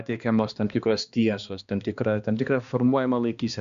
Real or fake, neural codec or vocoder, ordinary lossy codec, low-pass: fake; codec, 16 kHz, 2 kbps, X-Codec, HuBERT features, trained on balanced general audio; Opus, 64 kbps; 7.2 kHz